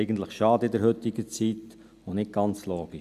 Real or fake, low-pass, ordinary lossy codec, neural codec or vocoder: real; 14.4 kHz; none; none